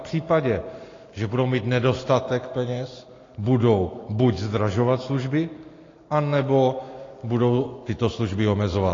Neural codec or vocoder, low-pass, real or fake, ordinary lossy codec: none; 7.2 kHz; real; AAC, 32 kbps